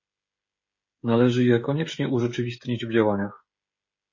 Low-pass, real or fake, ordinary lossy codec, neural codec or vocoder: 7.2 kHz; fake; MP3, 32 kbps; codec, 16 kHz, 16 kbps, FreqCodec, smaller model